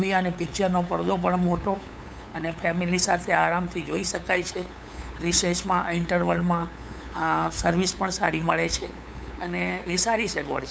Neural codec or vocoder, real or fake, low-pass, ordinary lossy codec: codec, 16 kHz, 8 kbps, FunCodec, trained on LibriTTS, 25 frames a second; fake; none; none